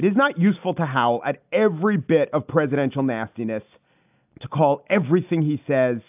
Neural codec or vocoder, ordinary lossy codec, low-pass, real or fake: none; AAC, 32 kbps; 3.6 kHz; real